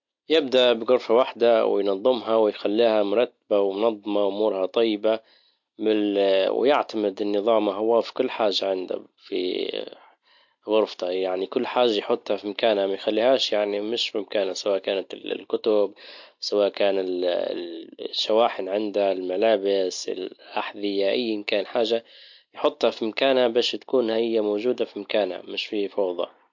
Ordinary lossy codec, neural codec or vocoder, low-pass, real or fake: MP3, 48 kbps; none; 7.2 kHz; real